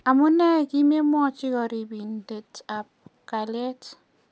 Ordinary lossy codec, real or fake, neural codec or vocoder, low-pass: none; real; none; none